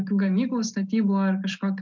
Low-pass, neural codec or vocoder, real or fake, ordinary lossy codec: 7.2 kHz; none; real; MP3, 64 kbps